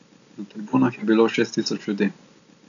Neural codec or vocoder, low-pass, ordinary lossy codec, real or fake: none; 7.2 kHz; none; real